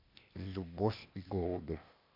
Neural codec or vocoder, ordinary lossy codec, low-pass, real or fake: codec, 16 kHz, 0.8 kbps, ZipCodec; MP3, 48 kbps; 5.4 kHz; fake